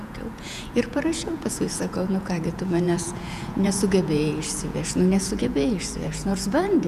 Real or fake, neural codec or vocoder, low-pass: fake; vocoder, 48 kHz, 128 mel bands, Vocos; 14.4 kHz